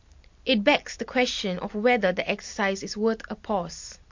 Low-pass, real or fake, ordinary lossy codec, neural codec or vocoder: 7.2 kHz; real; MP3, 48 kbps; none